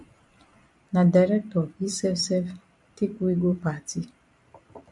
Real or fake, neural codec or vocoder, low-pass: fake; vocoder, 44.1 kHz, 128 mel bands every 256 samples, BigVGAN v2; 10.8 kHz